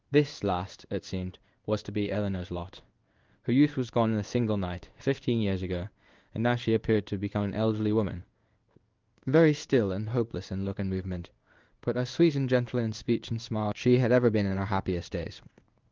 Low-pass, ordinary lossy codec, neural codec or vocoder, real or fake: 7.2 kHz; Opus, 24 kbps; codec, 16 kHz in and 24 kHz out, 1 kbps, XY-Tokenizer; fake